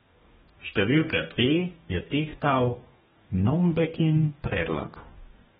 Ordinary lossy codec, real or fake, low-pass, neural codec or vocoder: AAC, 16 kbps; fake; 19.8 kHz; codec, 44.1 kHz, 2.6 kbps, DAC